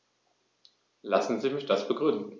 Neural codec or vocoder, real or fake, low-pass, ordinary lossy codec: none; real; none; none